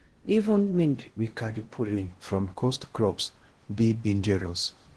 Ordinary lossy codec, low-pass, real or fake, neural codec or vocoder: Opus, 16 kbps; 10.8 kHz; fake; codec, 16 kHz in and 24 kHz out, 0.6 kbps, FocalCodec, streaming, 2048 codes